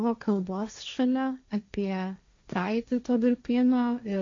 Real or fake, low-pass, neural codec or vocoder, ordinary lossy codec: fake; 7.2 kHz; codec, 16 kHz, 1.1 kbps, Voila-Tokenizer; AAC, 48 kbps